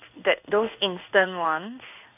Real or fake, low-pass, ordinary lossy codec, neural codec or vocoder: fake; 3.6 kHz; none; codec, 16 kHz in and 24 kHz out, 1 kbps, XY-Tokenizer